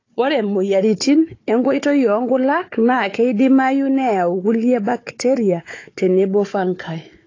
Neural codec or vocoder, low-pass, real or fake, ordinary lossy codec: codec, 16 kHz, 4 kbps, FunCodec, trained on Chinese and English, 50 frames a second; 7.2 kHz; fake; AAC, 32 kbps